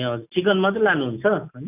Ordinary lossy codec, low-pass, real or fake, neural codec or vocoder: none; 3.6 kHz; real; none